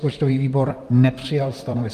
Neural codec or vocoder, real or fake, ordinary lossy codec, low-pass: vocoder, 44.1 kHz, 128 mel bands, Pupu-Vocoder; fake; Opus, 32 kbps; 14.4 kHz